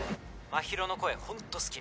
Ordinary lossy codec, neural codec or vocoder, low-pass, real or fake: none; none; none; real